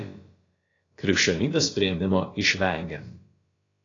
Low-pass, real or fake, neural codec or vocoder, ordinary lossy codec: 7.2 kHz; fake; codec, 16 kHz, about 1 kbps, DyCAST, with the encoder's durations; AAC, 32 kbps